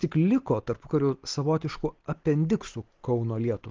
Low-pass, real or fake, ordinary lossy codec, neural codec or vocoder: 7.2 kHz; real; Opus, 16 kbps; none